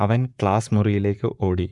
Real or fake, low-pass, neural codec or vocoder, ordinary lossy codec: fake; 10.8 kHz; codec, 44.1 kHz, 7.8 kbps, Pupu-Codec; MP3, 96 kbps